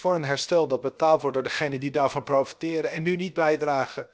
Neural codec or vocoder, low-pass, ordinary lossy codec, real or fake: codec, 16 kHz, 0.7 kbps, FocalCodec; none; none; fake